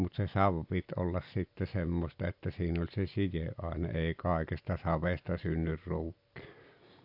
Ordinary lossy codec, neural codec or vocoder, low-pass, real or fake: AAC, 48 kbps; none; 5.4 kHz; real